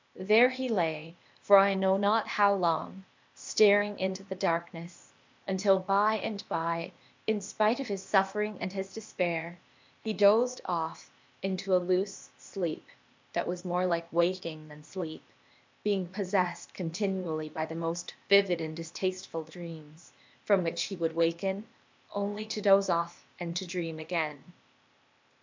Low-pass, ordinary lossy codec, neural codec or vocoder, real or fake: 7.2 kHz; MP3, 64 kbps; codec, 16 kHz, 0.8 kbps, ZipCodec; fake